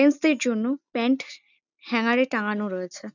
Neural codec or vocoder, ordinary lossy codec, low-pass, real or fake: none; none; 7.2 kHz; real